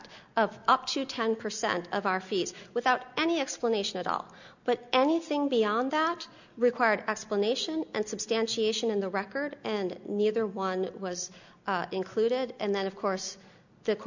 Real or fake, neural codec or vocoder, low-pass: real; none; 7.2 kHz